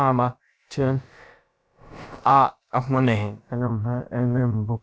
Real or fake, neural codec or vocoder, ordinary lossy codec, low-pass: fake; codec, 16 kHz, about 1 kbps, DyCAST, with the encoder's durations; none; none